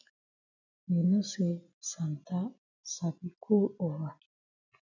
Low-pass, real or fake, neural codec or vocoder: 7.2 kHz; real; none